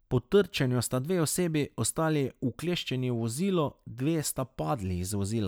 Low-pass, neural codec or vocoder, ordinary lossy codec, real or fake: none; none; none; real